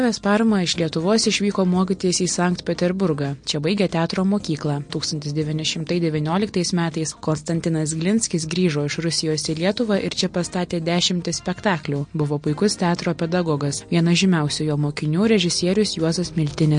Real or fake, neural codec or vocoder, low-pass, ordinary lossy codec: real; none; 9.9 kHz; MP3, 48 kbps